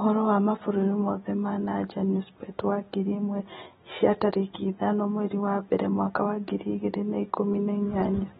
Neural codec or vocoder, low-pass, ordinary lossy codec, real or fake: none; 19.8 kHz; AAC, 16 kbps; real